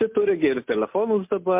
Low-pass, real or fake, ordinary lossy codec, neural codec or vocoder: 3.6 kHz; real; MP3, 32 kbps; none